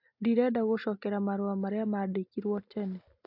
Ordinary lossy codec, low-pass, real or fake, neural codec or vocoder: AAC, 48 kbps; 5.4 kHz; real; none